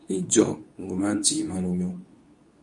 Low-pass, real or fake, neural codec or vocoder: 10.8 kHz; fake; codec, 24 kHz, 0.9 kbps, WavTokenizer, medium speech release version 2